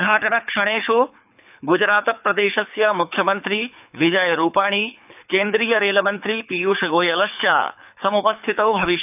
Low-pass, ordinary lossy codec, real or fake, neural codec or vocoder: 3.6 kHz; none; fake; codec, 24 kHz, 6 kbps, HILCodec